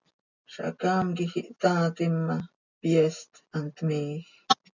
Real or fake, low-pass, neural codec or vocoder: real; 7.2 kHz; none